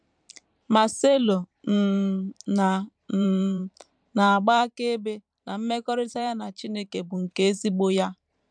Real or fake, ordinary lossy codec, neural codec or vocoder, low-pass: fake; MP3, 96 kbps; vocoder, 44.1 kHz, 128 mel bands every 512 samples, BigVGAN v2; 9.9 kHz